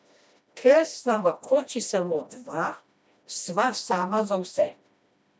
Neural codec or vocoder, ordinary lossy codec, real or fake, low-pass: codec, 16 kHz, 1 kbps, FreqCodec, smaller model; none; fake; none